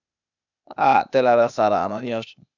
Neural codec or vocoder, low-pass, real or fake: codec, 16 kHz, 0.8 kbps, ZipCodec; 7.2 kHz; fake